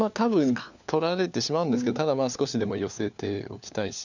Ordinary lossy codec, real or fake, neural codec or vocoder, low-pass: none; fake; codec, 16 kHz, 4 kbps, FunCodec, trained on LibriTTS, 50 frames a second; 7.2 kHz